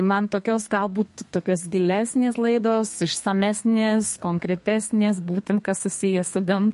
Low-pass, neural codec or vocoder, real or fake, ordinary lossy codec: 14.4 kHz; codec, 32 kHz, 1.9 kbps, SNAC; fake; MP3, 48 kbps